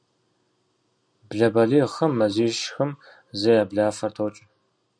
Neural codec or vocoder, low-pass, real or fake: none; 9.9 kHz; real